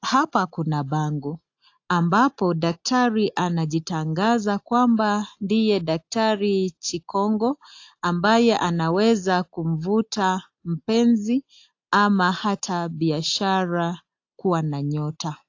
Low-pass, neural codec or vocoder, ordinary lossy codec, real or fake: 7.2 kHz; none; AAC, 48 kbps; real